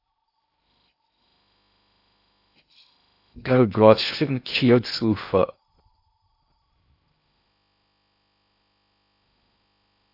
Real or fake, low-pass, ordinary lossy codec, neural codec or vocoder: fake; 5.4 kHz; AAC, 32 kbps; codec, 16 kHz in and 24 kHz out, 0.6 kbps, FocalCodec, streaming, 2048 codes